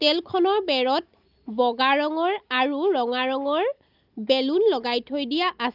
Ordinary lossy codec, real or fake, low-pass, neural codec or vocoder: Opus, 24 kbps; real; 5.4 kHz; none